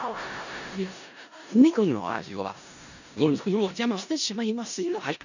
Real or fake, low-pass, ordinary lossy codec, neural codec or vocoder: fake; 7.2 kHz; none; codec, 16 kHz in and 24 kHz out, 0.4 kbps, LongCat-Audio-Codec, four codebook decoder